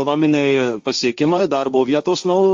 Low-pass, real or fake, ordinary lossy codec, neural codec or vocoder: 7.2 kHz; fake; Opus, 32 kbps; codec, 16 kHz, 1.1 kbps, Voila-Tokenizer